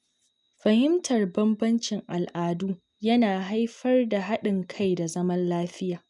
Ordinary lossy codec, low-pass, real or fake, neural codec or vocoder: none; 10.8 kHz; real; none